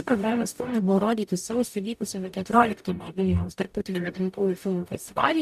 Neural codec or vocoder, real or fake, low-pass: codec, 44.1 kHz, 0.9 kbps, DAC; fake; 14.4 kHz